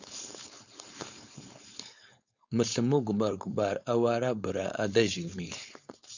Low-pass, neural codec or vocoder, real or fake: 7.2 kHz; codec, 16 kHz, 4.8 kbps, FACodec; fake